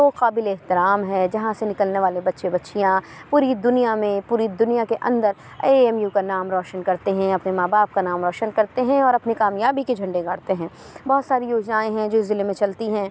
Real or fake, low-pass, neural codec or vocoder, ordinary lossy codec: real; none; none; none